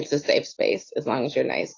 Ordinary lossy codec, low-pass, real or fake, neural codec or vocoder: AAC, 32 kbps; 7.2 kHz; real; none